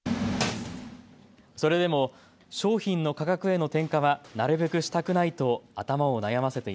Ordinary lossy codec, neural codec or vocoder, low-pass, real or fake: none; none; none; real